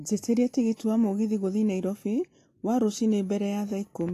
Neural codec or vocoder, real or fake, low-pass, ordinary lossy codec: none; real; 14.4 kHz; AAC, 48 kbps